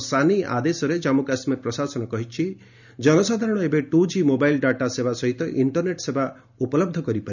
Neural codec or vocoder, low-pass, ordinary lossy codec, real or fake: none; 7.2 kHz; none; real